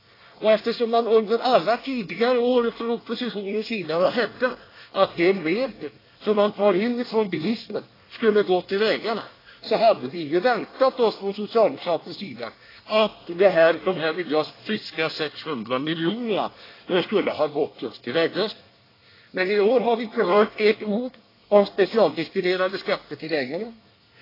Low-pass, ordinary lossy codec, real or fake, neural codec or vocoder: 5.4 kHz; AAC, 24 kbps; fake; codec, 24 kHz, 1 kbps, SNAC